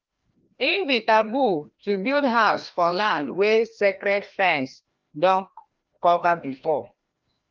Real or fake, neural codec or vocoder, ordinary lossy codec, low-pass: fake; codec, 16 kHz, 1 kbps, FreqCodec, larger model; Opus, 32 kbps; 7.2 kHz